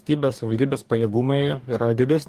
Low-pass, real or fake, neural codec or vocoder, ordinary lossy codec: 14.4 kHz; fake; codec, 44.1 kHz, 2.6 kbps, DAC; Opus, 32 kbps